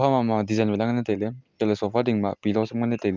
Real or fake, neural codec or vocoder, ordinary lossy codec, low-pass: real; none; Opus, 24 kbps; 7.2 kHz